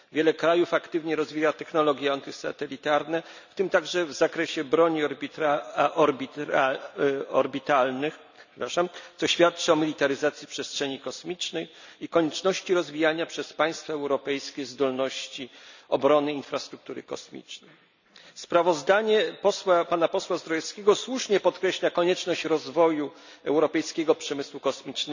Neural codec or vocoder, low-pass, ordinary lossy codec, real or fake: none; 7.2 kHz; none; real